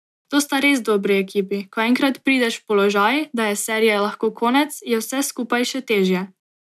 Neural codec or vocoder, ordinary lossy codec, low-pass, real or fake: none; none; 14.4 kHz; real